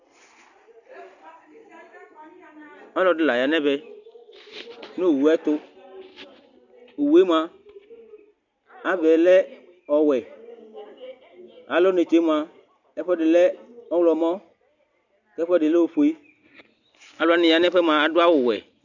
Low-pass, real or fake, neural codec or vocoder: 7.2 kHz; real; none